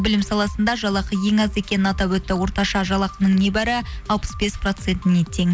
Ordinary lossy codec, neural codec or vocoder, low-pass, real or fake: none; none; none; real